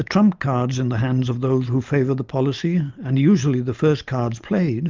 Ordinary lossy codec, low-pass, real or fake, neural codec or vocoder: Opus, 24 kbps; 7.2 kHz; real; none